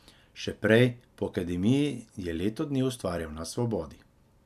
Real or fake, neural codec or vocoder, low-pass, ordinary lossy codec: real; none; 14.4 kHz; none